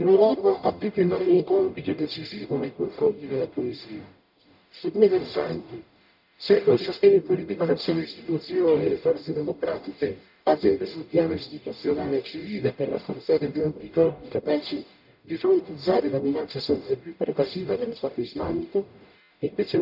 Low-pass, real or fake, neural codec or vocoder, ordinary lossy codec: 5.4 kHz; fake; codec, 44.1 kHz, 0.9 kbps, DAC; none